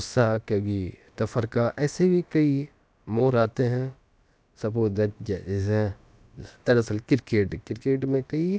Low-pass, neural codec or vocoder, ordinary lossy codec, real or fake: none; codec, 16 kHz, about 1 kbps, DyCAST, with the encoder's durations; none; fake